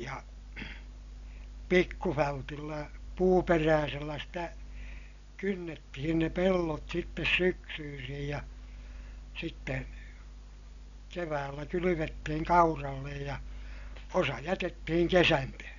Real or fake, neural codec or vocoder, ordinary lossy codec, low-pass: real; none; MP3, 96 kbps; 7.2 kHz